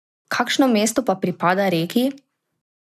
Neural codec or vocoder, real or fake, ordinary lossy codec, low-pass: none; real; none; 14.4 kHz